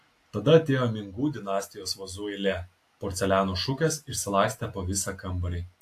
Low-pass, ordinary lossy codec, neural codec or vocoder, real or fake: 14.4 kHz; AAC, 64 kbps; none; real